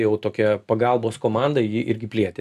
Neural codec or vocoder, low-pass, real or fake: none; 14.4 kHz; real